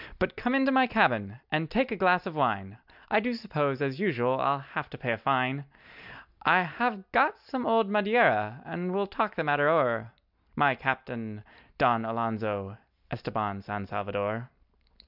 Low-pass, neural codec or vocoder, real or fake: 5.4 kHz; none; real